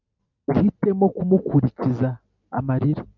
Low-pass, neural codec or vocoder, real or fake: 7.2 kHz; none; real